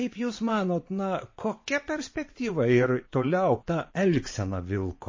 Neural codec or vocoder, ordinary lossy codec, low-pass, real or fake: vocoder, 22.05 kHz, 80 mel bands, WaveNeXt; MP3, 32 kbps; 7.2 kHz; fake